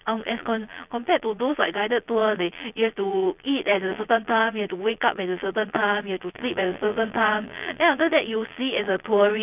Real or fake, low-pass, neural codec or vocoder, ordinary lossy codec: fake; 3.6 kHz; vocoder, 22.05 kHz, 80 mel bands, Vocos; none